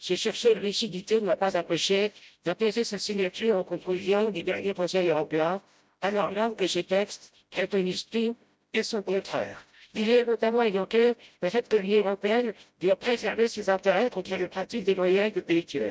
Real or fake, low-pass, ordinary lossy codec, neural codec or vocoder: fake; none; none; codec, 16 kHz, 0.5 kbps, FreqCodec, smaller model